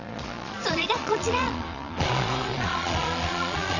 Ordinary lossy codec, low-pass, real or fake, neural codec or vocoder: none; 7.2 kHz; fake; vocoder, 22.05 kHz, 80 mel bands, Vocos